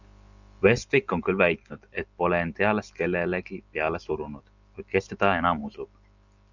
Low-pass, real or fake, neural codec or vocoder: 7.2 kHz; real; none